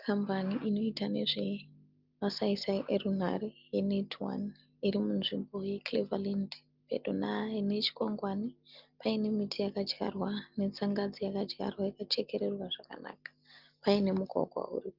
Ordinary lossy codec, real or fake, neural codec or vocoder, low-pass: Opus, 32 kbps; real; none; 5.4 kHz